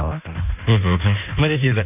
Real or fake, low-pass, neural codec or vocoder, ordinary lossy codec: fake; 3.6 kHz; codec, 16 kHz in and 24 kHz out, 1.1 kbps, FireRedTTS-2 codec; none